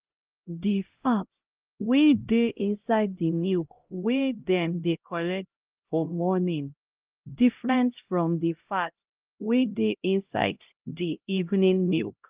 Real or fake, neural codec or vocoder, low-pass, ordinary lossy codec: fake; codec, 16 kHz, 0.5 kbps, X-Codec, HuBERT features, trained on LibriSpeech; 3.6 kHz; Opus, 32 kbps